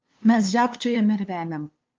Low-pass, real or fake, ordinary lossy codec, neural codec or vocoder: 7.2 kHz; fake; Opus, 24 kbps; codec, 16 kHz, 2 kbps, FunCodec, trained on LibriTTS, 25 frames a second